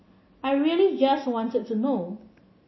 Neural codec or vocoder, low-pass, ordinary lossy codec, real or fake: none; 7.2 kHz; MP3, 24 kbps; real